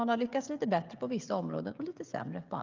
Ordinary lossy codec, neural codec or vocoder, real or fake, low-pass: Opus, 32 kbps; codec, 16 kHz, 16 kbps, FreqCodec, smaller model; fake; 7.2 kHz